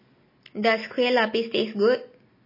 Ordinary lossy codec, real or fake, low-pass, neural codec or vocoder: MP3, 24 kbps; real; 5.4 kHz; none